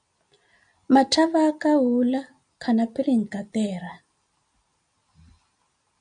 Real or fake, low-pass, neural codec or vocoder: real; 9.9 kHz; none